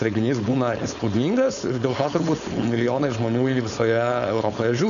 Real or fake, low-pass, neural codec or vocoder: fake; 7.2 kHz; codec, 16 kHz, 4.8 kbps, FACodec